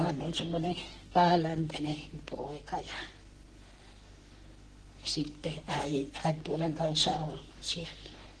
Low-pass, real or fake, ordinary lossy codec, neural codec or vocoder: 10.8 kHz; fake; Opus, 16 kbps; codec, 44.1 kHz, 3.4 kbps, Pupu-Codec